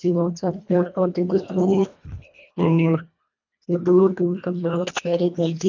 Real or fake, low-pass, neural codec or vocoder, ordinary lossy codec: fake; 7.2 kHz; codec, 24 kHz, 1.5 kbps, HILCodec; none